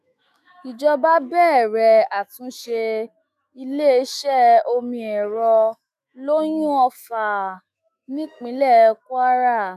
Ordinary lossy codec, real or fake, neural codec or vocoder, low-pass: none; fake; autoencoder, 48 kHz, 128 numbers a frame, DAC-VAE, trained on Japanese speech; 14.4 kHz